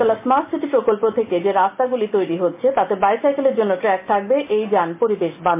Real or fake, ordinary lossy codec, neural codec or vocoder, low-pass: real; MP3, 24 kbps; none; 3.6 kHz